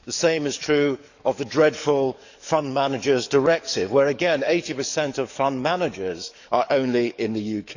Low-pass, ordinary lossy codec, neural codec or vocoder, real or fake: 7.2 kHz; none; codec, 44.1 kHz, 7.8 kbps, DAC; fake